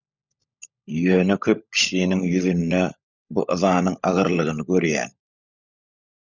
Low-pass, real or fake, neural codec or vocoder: 7.2 kHz; fake; codec, 16 kHz, 16 kbps, FunCodec, trained on LibriTTS, 50 frames a second